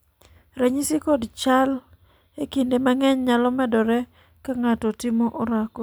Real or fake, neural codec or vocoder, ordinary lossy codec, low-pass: real; none; none; none